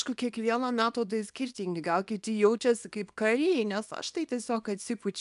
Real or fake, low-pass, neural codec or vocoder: fake; 10.8 kHz; codec, 24 kHz, 0.9 kbps, WavTokenizer, small release